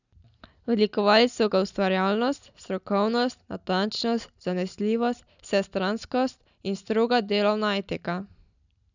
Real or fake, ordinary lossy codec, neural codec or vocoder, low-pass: real; none; none; 7.2 kHz